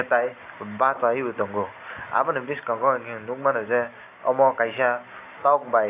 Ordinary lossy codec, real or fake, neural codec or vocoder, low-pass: AAC, 24 kbps; real; none; 3.6 kHz